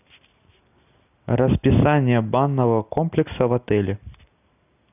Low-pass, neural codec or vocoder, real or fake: 3.6 kHz; none; real